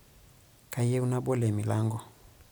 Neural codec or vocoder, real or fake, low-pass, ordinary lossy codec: none; real; none; none